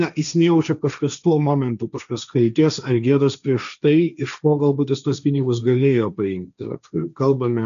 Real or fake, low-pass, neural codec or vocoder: fake; 7.2 kHz; codec, 16 kHz, 1.1 kbps, Voila-Tokenizer